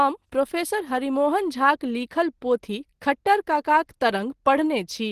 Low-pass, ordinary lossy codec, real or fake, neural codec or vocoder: 14.4 kHz; Opus, 16 kbps; real; none